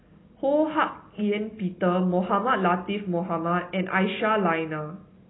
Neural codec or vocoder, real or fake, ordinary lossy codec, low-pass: none; real; AAC, 16 kbps; 7.2 kHz